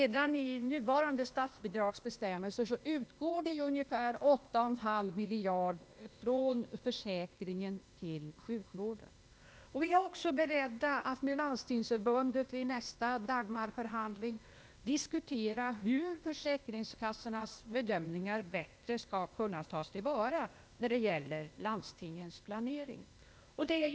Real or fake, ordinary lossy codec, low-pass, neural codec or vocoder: fake; none; none; codec, 16 kHz, 0.8 kbps, ZipCodec